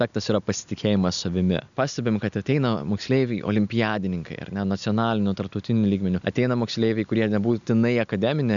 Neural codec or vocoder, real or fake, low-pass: none; real; 7.2 kHz